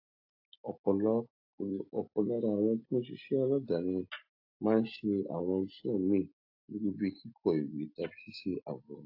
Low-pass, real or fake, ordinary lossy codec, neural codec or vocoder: 5.4 kHz; real; AAC, 48 kbps; none